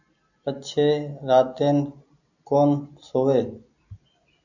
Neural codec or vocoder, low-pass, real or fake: none; 7.2 kHz; real